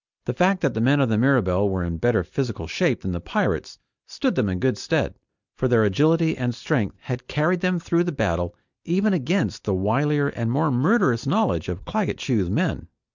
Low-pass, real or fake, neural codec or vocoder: 7.2 kHz; real; none